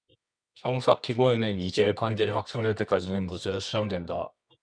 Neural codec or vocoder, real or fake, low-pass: codec, 24 kHz, 0.9 kbps, WavTokenizer, medium music audio release; fake; 9.9 kHz